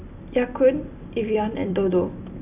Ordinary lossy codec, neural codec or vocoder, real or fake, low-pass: none; vocoder, 44.1 kHz, 128 mel bands every 256 samples, BigVGAN v2; fake; 3.6 kHz